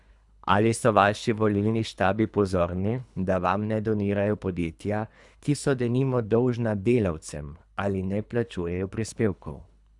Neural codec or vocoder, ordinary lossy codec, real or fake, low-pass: codec, 24 kHz, 3 kbps, HILCodec; none; fake; 10.8 kHz